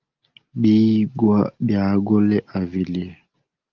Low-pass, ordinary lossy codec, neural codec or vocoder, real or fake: 7.2 kHz; Opus, 32 kbps; none; real